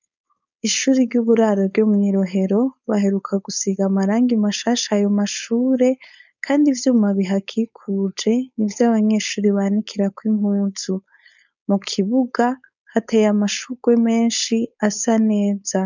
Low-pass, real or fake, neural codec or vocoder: 7.2 kHz; fake; codec, 16 kHz, 4.8 kbps, FACodec